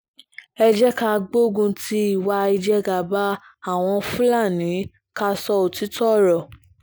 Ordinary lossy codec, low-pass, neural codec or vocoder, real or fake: none; none; none; real